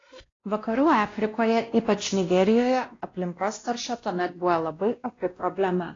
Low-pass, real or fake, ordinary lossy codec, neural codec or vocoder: 7.2 kHz; fake; AAC, 32 kbps; codec, 16 kHz, 1 kbps, X-Codec, WavLM features, trained on Multilingual LibriSpeech